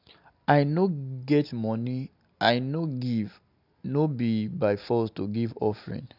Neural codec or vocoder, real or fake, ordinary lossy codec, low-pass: none; real; none; 5.4 kHz